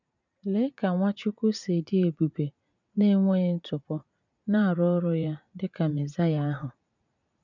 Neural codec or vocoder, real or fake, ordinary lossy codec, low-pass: vocoder, 44.1 kHz, 80 mel bands, Vocos; fake; none; 7.2 kHz